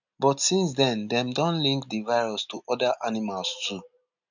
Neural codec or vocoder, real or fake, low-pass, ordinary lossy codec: none; real; 7.2 kHz; none